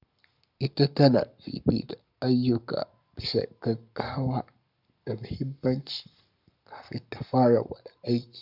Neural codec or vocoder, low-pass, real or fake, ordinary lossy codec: codec, 44.1 kHz, 7.8 kbps, Pupu-Codec; 5.4 kHz; fake; none